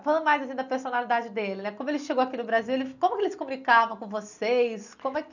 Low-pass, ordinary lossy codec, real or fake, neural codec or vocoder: 7.2 kHz; none; real; none